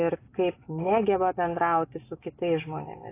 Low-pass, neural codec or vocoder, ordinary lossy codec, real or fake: 3.6 kHz; none; AAC, 24 kbps; real